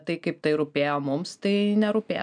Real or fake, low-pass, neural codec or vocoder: real; 9.9 kHz; none